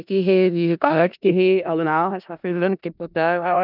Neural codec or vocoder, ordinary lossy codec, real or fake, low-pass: codec, 16 kHz in and 24 kHz out, 0.4 kbps, LongCat-Audio-Codec, four codebook decoder; none; fake; 5.4 kHz